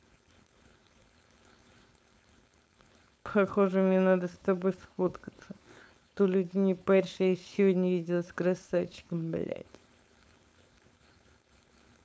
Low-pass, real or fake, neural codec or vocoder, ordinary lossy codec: none; fake; codec, 16 kHz, 4.8 kbps, FACodec; none